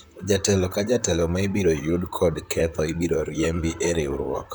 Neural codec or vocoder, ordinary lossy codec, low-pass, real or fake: vocoder, 44.1 kHz, 128 mel bands, Pupu-Vocoder; none; none; fake